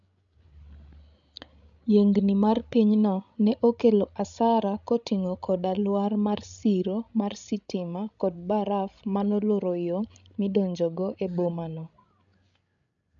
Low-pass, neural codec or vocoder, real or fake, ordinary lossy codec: 7.2 kHz; codec, 16 kHz, 16 kbps, FreqCodec, larger model; fake; none